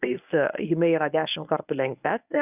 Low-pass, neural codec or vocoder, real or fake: 3.6 kHz; codec, 24 kHz, 0.9 kbps, WavTokenizer, medium speech release version 1; fake